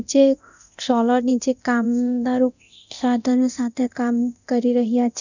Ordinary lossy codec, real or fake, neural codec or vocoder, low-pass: none; fake; codec, 24 kHz, 0.9 kbps, DualCodec; 7.2 kHz